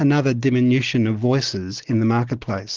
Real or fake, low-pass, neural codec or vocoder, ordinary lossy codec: real; 7.2 kHz; none; Opus, 16 kbps